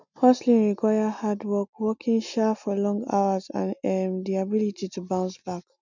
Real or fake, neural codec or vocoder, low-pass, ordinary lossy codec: real; none; 7.2 kHz; none